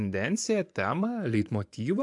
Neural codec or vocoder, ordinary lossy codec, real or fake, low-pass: vocoder, 24 kHz, 100 mel bands, Vocos; AAC, 64 kbps; fake; 10.8 kHz